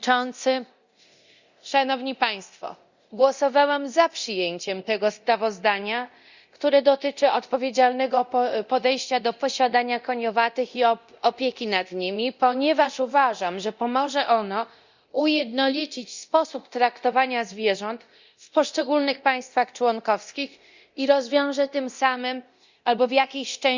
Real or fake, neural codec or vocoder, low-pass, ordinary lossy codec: fake; codec, 24 kHz, 0.9 kbps, DualCodec; 7.2 kHz; Opus, 64 kbps